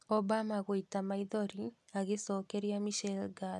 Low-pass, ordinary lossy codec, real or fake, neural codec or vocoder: none; none; real; none